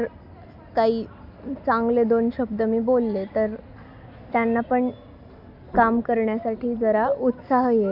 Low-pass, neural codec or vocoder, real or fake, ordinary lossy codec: 5.4 kHz; none; real; none